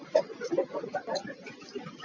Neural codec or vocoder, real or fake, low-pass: none; real; 7.2 kHz